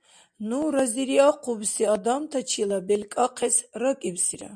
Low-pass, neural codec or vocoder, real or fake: 9.9 kHz; none; real